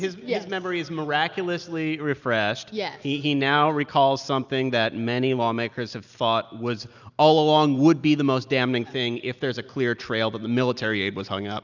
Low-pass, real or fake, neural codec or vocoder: 7.2 kHz; real; none